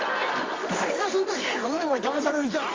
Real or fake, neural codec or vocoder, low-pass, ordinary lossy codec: fake; codec, 24 kHz, 0.9 kbps, WavTokenizer, medium music audio release; 7.2 kHz; Opus, 32 kbps